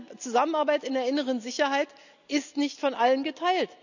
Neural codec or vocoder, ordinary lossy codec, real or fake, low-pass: none; none; real; 7.2 kHz